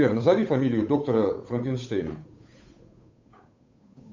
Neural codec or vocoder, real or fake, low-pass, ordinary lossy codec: codec, 16 kHz, 8 kbps, FunCodec, trained on Chinese and English, 25 frames a second; fake; 7.2 kHz; MP3, 64 kbps